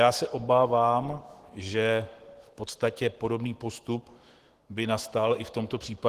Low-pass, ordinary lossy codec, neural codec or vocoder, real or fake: 14.4 kHz; Opus, 16 kbps; autoencoder, 48 kHz, 128 numbers a frame, DAC-VAE, trained on Japanese speech; fake